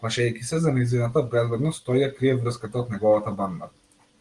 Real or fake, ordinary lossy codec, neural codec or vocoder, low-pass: real; Opus, 24 kbps; none; 10.8 kHz